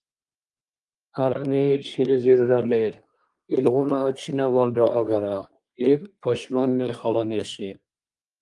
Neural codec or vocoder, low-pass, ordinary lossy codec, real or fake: codec, 24 kHz, 1 kbps, SNAC; 10.8 kHz; Opus, 32 kbps; fake